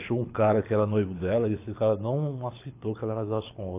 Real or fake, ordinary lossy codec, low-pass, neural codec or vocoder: fake; AAC, 24 kbps; 3.6 kHz; codec, 24 kHz, 6 kbps, HILCodec